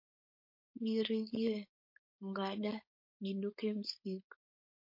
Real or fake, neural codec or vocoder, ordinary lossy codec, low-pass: fake; codec, 16 kHz, 4.8 kbps, FACodec; MP3, 32 kbps; 5.4 kHz